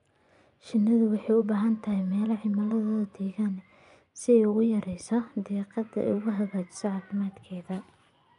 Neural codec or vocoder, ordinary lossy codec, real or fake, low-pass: vocoder, 24 kHz, 100 mel bands, Vocos; none; fake; 10.8 kHz